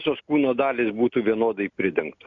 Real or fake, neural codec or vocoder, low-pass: real; none; 7.2 kHz